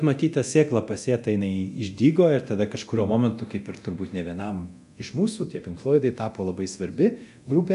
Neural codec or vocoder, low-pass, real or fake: codec, 24 kHz, 0.9 kbps, DualCodec; 10.8 kHz; fake